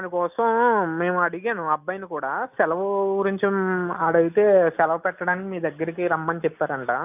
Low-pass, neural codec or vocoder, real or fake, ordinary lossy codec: 3.6 kHz; none; real; none